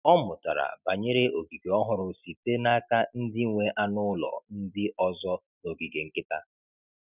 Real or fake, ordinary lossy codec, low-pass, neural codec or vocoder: real; none; 3.6 kHz; none